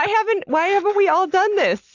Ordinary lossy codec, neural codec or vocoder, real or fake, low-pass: AAC, 48 kbps; none; real; 7.2 kHz